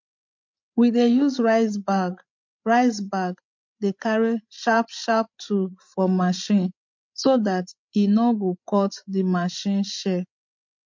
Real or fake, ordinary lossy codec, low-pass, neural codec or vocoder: fake; MP3, 48 kbps; 7.2 kHz; codec, 16 kHz, 16 kbps, FreqCodec, larger model